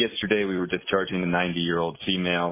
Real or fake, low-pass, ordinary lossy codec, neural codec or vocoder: real; 3.6 kHz; AAC, 32 kbps; none